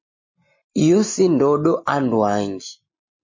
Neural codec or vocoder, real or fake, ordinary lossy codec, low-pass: none; real; MP3, 32 kbps; 7.2 kHz